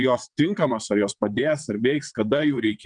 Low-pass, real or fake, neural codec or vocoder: 9.9 kHz; fake; vocoder, 22.05 kHz, 80 mel bands, WaveNeXt